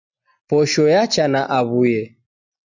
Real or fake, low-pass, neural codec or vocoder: real; 7.2 kHz; none